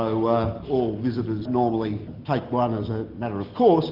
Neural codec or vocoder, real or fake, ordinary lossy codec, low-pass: none; real; Opus, 16 kbps; 5.4 kHz